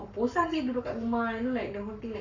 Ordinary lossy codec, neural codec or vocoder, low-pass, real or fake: none; codec, 44.1 kHz, 7.8 kbps, Pupu-Codec; 7.2 kHz; fake